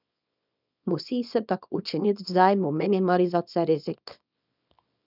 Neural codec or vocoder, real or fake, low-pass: codec, 24 kHz, 0.9 kbps, WavTokenizer, small release; fake; 5.4 kHz